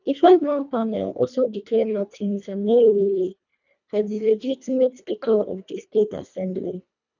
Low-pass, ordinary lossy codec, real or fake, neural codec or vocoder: 7.2 kHz; none; fake; codec, 24 kHz, 1.5 kbps, HILCodec